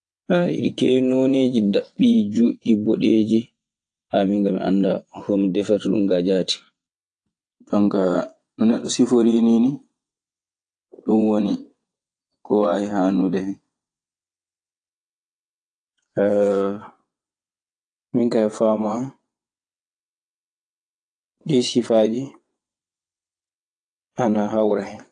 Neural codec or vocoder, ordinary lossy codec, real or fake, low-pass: vocoder, 22.05 kHz, 80 mel bands, WaveNeXt; AAC, 48 kbps; fake; 9.9 kHz